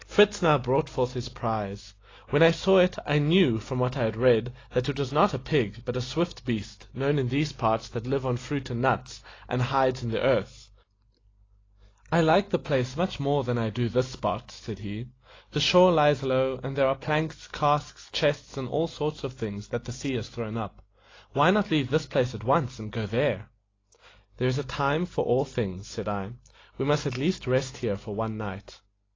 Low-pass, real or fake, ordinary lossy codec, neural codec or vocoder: 7.2 kHz; real; AAC, 32 kbps; none